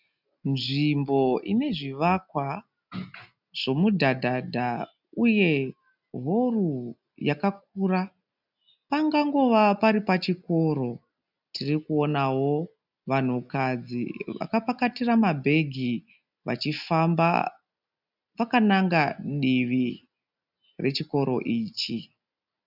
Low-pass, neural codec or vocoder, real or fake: 5.4 kHz; none; real